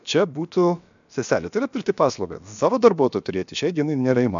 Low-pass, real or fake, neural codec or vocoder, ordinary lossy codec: 7.2 kHz; fake; codec, 16 kHz, about 1 kbps, DyCAST, with the encoder's durations; MP3, 64 kbps